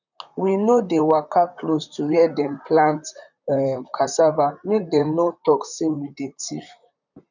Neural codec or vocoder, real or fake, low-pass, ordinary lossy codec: vocoder, 44.1 kHz, 128 mel bands, Pupu-Vocoder; fake; 7.2 kHz; none